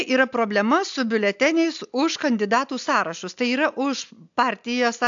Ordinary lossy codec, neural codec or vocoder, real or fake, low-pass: AAC, 64 kbps; none; real; 7.2 kHz